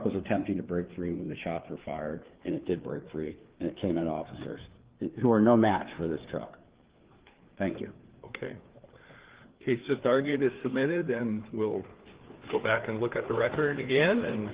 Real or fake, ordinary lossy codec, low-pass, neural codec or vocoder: fake; Opus, 16 kbps; 3.6 kHz; codec, 16 kHz, 4 kbps, FreqCodec, larger model